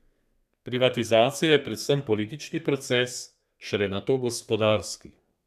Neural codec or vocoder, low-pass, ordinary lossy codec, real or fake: codec, 32 kHz, 1.9 kbps, SNAC; 14.4 kHz; none; fake